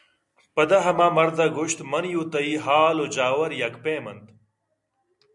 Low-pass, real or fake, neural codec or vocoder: 10.8 kHz; real; none